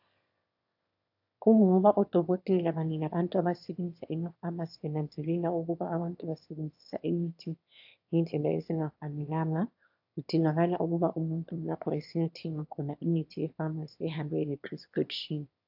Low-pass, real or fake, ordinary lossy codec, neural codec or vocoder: 5.4 kHz; fake; AAC, 48 kbps; autoencoder, 22.05 kHz, a latent of 192 numbers a frame, VITS, trained on one speaker